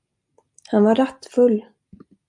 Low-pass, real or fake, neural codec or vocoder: 10.8 kHz; real; none